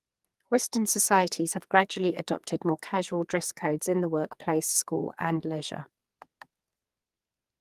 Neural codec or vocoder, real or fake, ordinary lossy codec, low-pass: codec, 44.1 kHz, 2.6 kbps, SNAC; fake; Opus, 32 kbps; 14.4 kHz